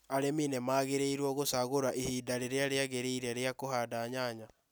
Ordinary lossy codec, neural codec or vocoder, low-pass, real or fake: none; none; none; real